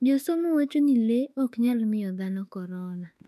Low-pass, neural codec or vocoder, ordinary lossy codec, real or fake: 14.4 kHz; autoencoder, 48 kHz, 32 numbers a frame, DAC-VAE, trained on Japanese speech; none; fake